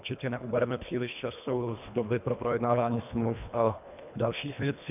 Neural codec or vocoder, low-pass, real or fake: codec, 24 kHz, 1.5 kbps, HILCodec; 3.6 kHz; fake